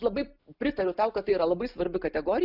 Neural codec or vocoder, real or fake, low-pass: none; real; 5.4 kHz